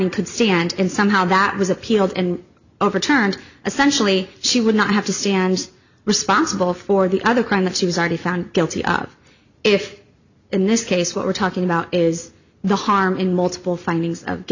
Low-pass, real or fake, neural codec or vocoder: 7.2 kHz; real; none